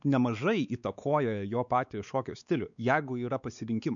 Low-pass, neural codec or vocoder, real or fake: 7.2 kHz; codec, 16 kHz, 4 kbps, X-Codec, WavLM features, trained on Multilingual LibriSpeech; fake